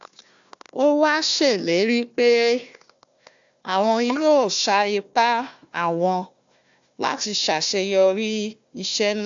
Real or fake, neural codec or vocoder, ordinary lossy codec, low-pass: fake; codec, 16 kHz, 1 kbps, FunCodec, trained on Chinese and English, 50 frames a second; AAC, 64 kbps; 7.2 kHz